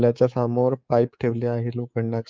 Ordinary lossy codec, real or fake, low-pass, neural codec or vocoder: Opus, 24 kbps; fake; 7.2 kHz; codec, 24 kHz, 3.1 kbps, DualCodec